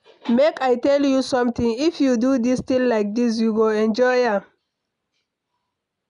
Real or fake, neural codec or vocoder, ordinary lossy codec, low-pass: real; none; none; 10.8 kHz